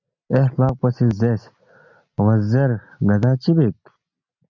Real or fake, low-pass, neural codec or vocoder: real; 7.2 kHz; none